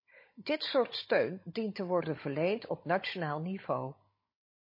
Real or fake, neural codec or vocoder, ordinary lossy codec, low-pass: fake; codec, 16 kHz, 16 kbps, FunCodec, trained on Chinese and English, 50 frames a second; MP3, 24 kbps; 5.4 kHz